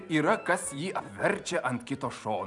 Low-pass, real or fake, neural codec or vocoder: 10.8 kHz; fake; vocoder, 44.1 kHz, 128 mel bands every 256 samples, BigVGAN v2